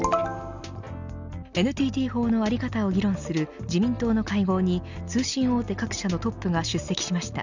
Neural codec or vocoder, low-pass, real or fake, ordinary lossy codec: none; 7.2 kHz; real; none